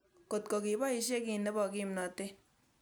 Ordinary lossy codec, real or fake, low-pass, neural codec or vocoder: none; real; none; none